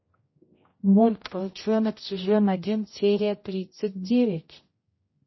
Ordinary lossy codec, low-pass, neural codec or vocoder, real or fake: MP3, 24 kbps; 7.2 kHz; codec, 16 kHz, 0.5 kbps, X-Codec, HuBERT features, trained on general audio; fake